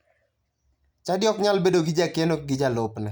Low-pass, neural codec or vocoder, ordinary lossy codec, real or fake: 19.8 kHz; none; none; real